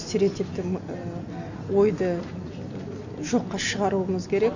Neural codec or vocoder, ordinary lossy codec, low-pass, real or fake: none; none; 7.2 kHz; real